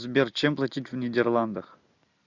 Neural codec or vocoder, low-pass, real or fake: none; 7.2 kHz; real